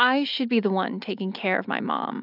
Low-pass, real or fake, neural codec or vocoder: 5.4 kHz; real; none